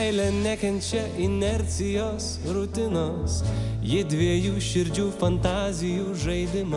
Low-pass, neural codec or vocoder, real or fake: 10.8 kHz; none; real